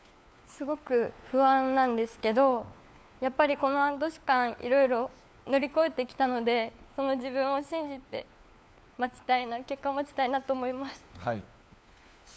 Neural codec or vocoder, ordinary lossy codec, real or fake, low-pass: codec, 16 kHz, 4 kbps, FunCodec, trained on LibriTTS, 50 frames a second; none; fake; none